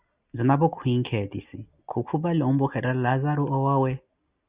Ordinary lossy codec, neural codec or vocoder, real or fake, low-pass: Opus, 64 kbps; none; real; 3.6 kHz